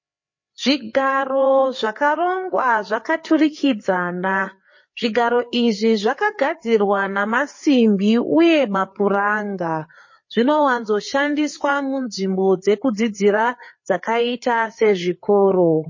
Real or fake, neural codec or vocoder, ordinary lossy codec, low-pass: fake; codec, 16 kHz, 4 kbps, FreqCodec, larger model; MP3, 32 kbps; 7.2 kHz